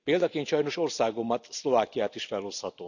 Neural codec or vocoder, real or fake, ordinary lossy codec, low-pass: none; real; none; 7.2 kHz